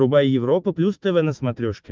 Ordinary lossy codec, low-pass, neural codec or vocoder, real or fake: Opus, 24 kbps; 7.2 kHz; autoencoder, 48 kHz, 128 numbers a frame, DAC-VAE, trained on Japanese speech; fake